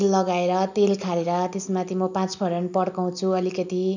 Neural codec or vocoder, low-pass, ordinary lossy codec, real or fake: none; 7.2 kHz; none; real